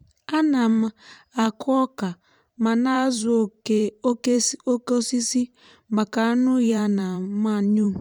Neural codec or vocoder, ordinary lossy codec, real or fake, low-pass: vocoder, 44.1 kHz, 128 mel bands every 512 samples, BigVGAN v2; none; fake; 19.8 kHz